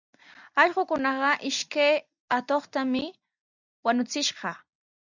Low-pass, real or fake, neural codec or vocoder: 7.2 kHz; real; none